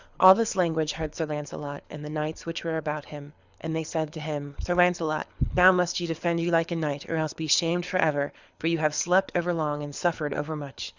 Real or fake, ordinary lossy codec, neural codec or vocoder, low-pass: fake; Opus, 64 kbps; codec, 24 kHz, 6 kbps, HILCodec; 7.2 kHz